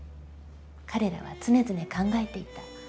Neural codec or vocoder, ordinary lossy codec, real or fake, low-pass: none; none; real; none